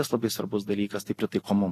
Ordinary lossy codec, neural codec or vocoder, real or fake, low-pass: AAC, 48 kbps; none; real; 14.4 kHz